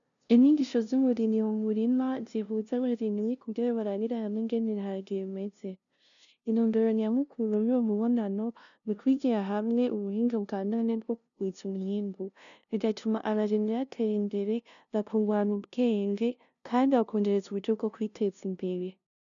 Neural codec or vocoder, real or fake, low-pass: codec, 16 kHz, 0.5 kbps, FunCodec, trained on LibriTTS, 25 frames a second; fake; 7.2 kHz